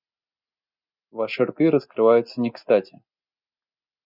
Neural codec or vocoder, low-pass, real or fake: none; 5.4 kHz; real